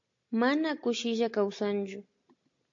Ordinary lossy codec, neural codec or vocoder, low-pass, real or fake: AAC, 48 kbps; none; 7.2 kHz; real